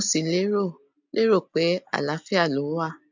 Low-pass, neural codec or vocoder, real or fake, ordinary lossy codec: 7.2 kHz; none; real; MP3, 64 kbps